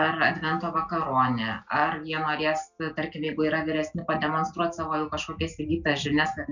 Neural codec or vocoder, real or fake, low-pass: none; real; 7.2 kHz